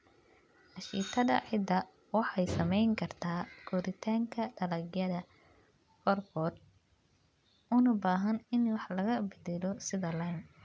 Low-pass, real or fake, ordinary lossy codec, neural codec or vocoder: none; real; none; none